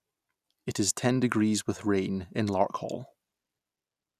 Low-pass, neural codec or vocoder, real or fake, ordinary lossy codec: 14.4 kHz; none; real; none